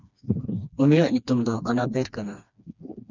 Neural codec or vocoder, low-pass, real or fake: codec, 16 kHz, 2 kbps, FreqCodec, smaller model; 7.2 kHz; fake